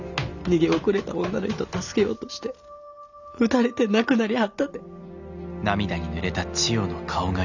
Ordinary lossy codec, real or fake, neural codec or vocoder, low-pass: none; real; none; 7.2 kHz